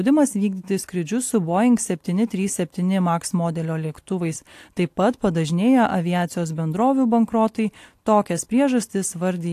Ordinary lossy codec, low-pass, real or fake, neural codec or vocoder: AAC, 64 kbps; 14.4 kHz; real; none